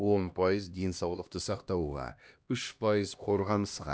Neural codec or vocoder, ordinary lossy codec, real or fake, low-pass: codec, 16 kHz, 1 kbps, X-Codec, HuBERT features, trained on LibriSpeech; none; fake; none